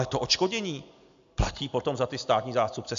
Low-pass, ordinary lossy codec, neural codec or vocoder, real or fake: 7.2 kHz; AAC, 64 kbps; none; real